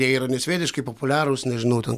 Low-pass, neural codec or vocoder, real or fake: 14.4 kHz; none; real